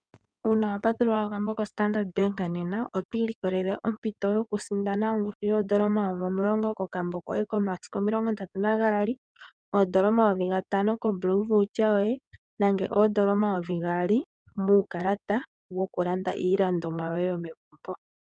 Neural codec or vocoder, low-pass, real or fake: codec, 16 kHz in and 24 kHz out, 2.2 kbps, FireRedTTS-2 codec; 9.9 kHz; fake